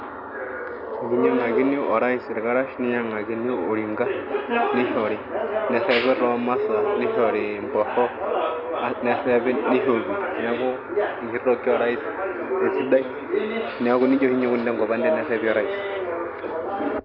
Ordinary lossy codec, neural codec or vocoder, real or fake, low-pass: none; none; real; 5.4 kHz